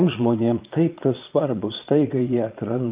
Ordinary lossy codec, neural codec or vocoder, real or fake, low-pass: Opus, 24 kbps; vocoder, 22.05 kHz, 80 mel bands, Vocos; fake; 3.6 kHz